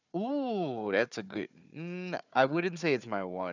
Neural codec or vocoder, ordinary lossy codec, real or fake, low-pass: codec, 16 kHz, 4 kbps, FunCodec, trained on Chinese and English, 50 frames a second; none; fake; 7.2 kHz